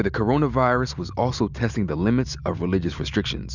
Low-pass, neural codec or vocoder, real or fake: 7.2 kHz; none; real